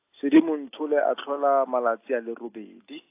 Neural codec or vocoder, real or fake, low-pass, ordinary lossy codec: none; real; 3.6 kHz; AAC, 24 kbps